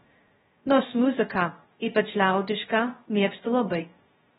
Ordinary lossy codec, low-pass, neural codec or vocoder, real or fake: AAC, 16 kbps; 7.2 kHz; codec, 16 kHz, 0.2 kbps, FocalCodec; fake